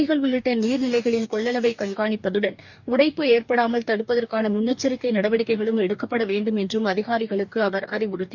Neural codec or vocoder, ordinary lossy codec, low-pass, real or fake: codec, 44.1 kHz, 2.6 kbps, DAC; none; 7.2 kHz; fake